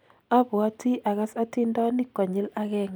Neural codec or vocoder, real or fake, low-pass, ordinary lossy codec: none; real; none; none